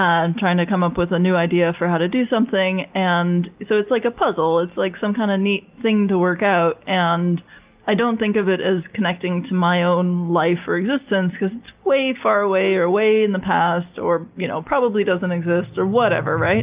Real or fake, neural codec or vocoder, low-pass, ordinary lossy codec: real; none; 3.6 kHz; Opus, 24 kbps